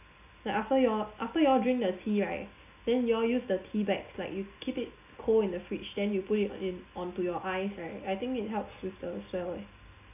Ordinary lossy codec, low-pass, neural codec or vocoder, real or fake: none; 3.6 kHz; none; real